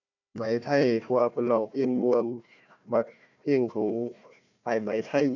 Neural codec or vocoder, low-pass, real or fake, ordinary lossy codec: codec, 16 kHz, 1 kbps, FunCodec, trained on Chinese and English, 50 frames a second; 7.2 kHz; fake; none